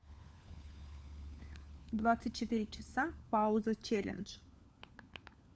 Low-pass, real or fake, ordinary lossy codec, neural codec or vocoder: none; fake; none; codec, 16 kHz, 4 kbps, FunCodec, trained on LibriTTS, 50 frames a second